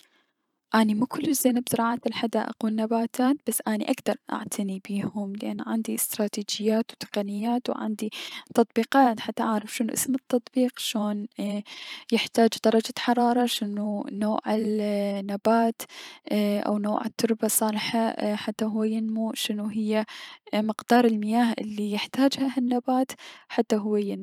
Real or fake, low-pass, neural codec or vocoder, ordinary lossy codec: fake; 19.8 kHz; vocoder, 44.1 kHz, 128 mel bands every 512 samples, BigVGAN v2; none